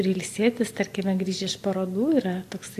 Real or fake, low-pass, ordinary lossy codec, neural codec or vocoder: real; 14.4 kHz; AAC, 64 kbps; none